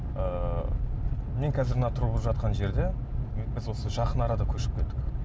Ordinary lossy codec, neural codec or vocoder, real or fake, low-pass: none; none; real; none